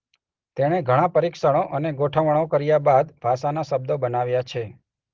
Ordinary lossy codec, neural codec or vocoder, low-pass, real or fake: Opus, 16 kbps; none; 7.2 kHz; real